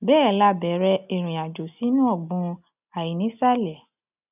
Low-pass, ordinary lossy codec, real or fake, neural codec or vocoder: 3.6 kHz; none; real; none